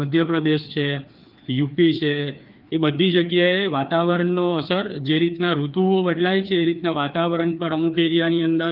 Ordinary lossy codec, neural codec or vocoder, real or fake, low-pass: Opus, 32 kbps; codec, 16 kHz, 2 kbps, FreqCodec, larger model; fake; 5.4 kHz